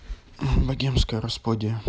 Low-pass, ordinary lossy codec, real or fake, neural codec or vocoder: none; none; real; none